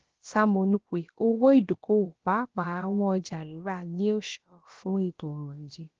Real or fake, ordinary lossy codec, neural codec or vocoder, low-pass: fake; Opus, 16 kbps; codec, 16 kHz, about 1 kbps, DyCAST, with the encoder's durations; 7.2 kHz